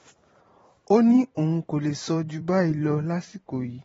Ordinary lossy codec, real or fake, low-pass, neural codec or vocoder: AAC, 24 kbps; fake; 19.8 kHz; vocoder, 44.1 kHz, 128 mel bands every 512 samples, BigVGAN v2